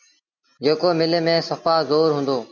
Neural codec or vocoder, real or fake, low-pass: none; real; 7.2 kHz